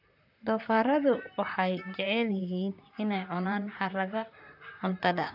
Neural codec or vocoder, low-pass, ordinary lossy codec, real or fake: vocoder, 44.1 kHz, 128 mel bands, Pupu-Vocoder; 5.4 kHz; AAC, 48 kbps; fake